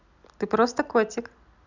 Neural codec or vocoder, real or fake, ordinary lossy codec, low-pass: none; real; none; 7.2 kHz